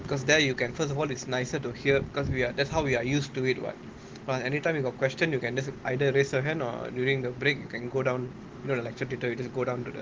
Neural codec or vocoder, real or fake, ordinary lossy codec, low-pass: none; real; Opus, 16 kbps; 7.2 kHz